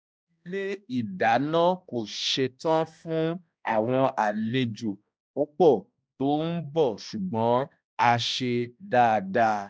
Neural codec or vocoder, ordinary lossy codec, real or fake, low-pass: codec, 16 kHz, 1 kbps, X-Codec, HuBERT features, trained on balanced general audio; none; fake; none